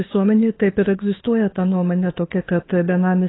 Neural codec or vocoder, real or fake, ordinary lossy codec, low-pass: autoencoder, 48 kHz, 32 numbers a frame, DAC-VAE, trained on Japanese speech; fake; AAC, 16 kbps; 7.2 kHz